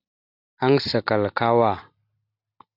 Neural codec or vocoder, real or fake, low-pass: none; real; 5.4 kHz